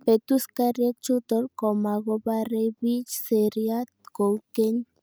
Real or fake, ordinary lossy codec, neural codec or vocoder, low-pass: real; none; none; none